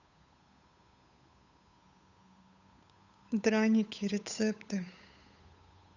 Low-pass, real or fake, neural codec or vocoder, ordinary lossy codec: 7.2 kHz; fake; codec, 16 kHz, 8 kbps, FunCodec, trained on Chinese and English, 25 frames a second; none